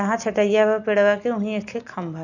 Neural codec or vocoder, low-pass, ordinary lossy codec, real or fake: none; 7.2 kHz; none; real